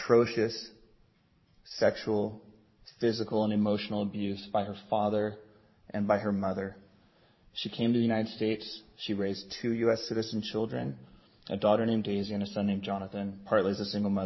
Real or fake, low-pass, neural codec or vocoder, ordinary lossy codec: fake; 7.2 kHz; vocoder, 44.1 kHz, 128 mel bands every 512 samples, BigVGAN v2; MP3, 24 kbps